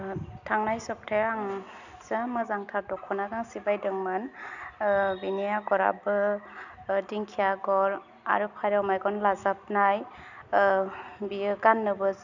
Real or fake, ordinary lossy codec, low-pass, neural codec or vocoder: real; none; 7.2 kHz; none